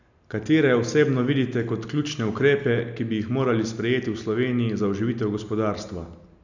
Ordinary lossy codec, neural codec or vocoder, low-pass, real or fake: none; none; 7.2 kHz; real